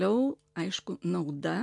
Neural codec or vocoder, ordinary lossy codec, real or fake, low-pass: none; MP3, 64 kbps; real; 10.8 kHz